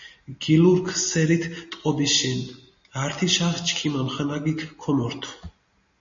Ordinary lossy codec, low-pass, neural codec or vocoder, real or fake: MP3, 32 kbps; 7.2 kHz; none; real